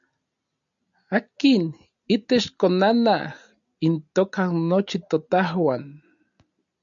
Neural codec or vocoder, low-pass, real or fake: none; 7.2 kHz; real